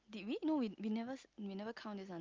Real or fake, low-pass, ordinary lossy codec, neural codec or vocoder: real; 7.2 kHz; Opus, 24 kbps; none